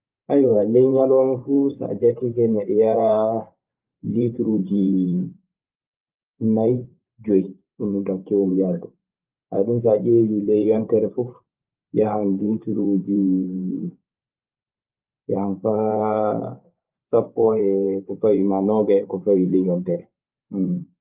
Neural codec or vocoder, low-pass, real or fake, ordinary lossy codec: vocoder, 44.1 kHz, 128 mel bands every 512 samples, BigVGAN v2; 3.6 kHz; fake; Opus, 24 kbps